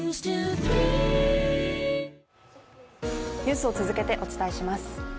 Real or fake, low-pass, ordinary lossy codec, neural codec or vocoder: real; none; none; none